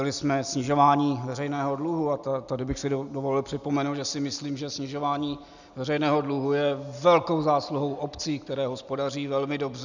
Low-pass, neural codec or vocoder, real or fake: 7.2 kHz; none; real